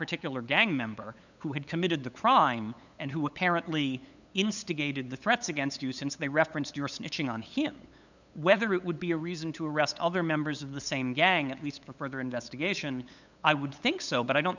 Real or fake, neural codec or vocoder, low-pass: fake; codec, 16 kHz, 8 kbps, FunCodec, trained on LibriTTS, 25 frames a second; 7.2 kHz